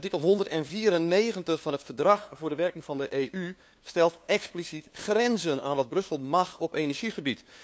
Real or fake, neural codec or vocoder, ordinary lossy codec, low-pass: fake; codec, 16 kHz, 2 kbps, FunCodec, trained on LibriTTS, 25 frames a second; none; none